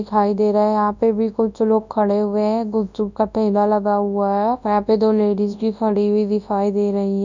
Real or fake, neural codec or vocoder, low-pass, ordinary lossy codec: fake; codec, 24 kHz, 0.9 kbps, WavTokenizer, large speech release; 7.2 kHz; none